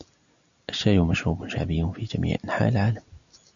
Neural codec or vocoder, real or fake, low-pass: none; real; 7.2 kHz